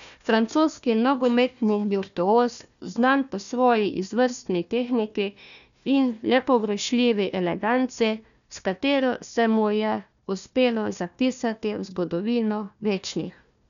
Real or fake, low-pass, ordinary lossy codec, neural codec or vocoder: fake; 7.2 kHz; none; codec, 16 kHz, 1 kbps, FunCodec, trained on Chinese and English, 50 frames a second